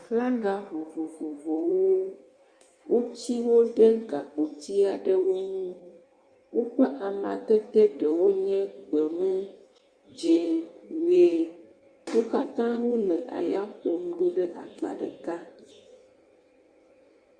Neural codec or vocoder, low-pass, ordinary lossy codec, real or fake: codec, 16 kHz in and 24 kHz out, 1.1 kbps, FireRedTTS-2 codec; 9.9 kHz; Opus, 64 kbps; fake